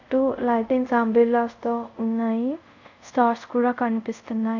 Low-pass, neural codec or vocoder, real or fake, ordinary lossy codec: 7.2 kHz; codec, 24 kHz, 0.5 kbps, DualCodec; fake; none